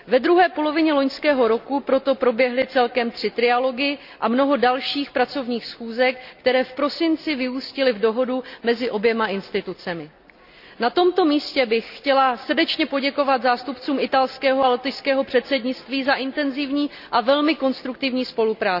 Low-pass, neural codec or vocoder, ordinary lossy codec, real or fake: 5.4 kHz; none; none; real